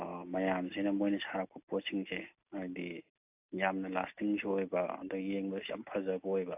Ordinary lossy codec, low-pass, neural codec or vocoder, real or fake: AAC, 32 kbps; 3.6 kHz; none; real